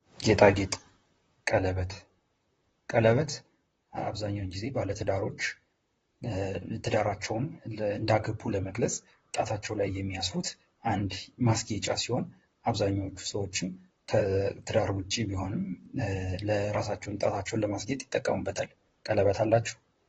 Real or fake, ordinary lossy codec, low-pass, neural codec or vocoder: fake; AAC, 24 kbps; 19.8 kHz; vocoder, 44.1 kHz, 128 mel bands, Pupu-Vocoder